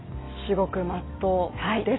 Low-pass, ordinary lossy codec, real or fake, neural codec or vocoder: 7.2 kHz; AAC, 16 kbps; real; none